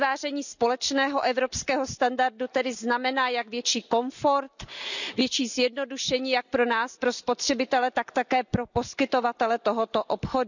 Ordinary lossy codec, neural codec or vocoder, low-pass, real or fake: none; none; 7.2 kHz; real